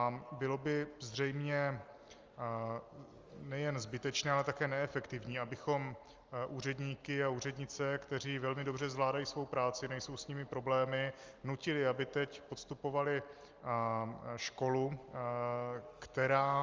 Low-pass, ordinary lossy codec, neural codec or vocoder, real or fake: 7.2 kHz; Opus, 32 kbps; none; real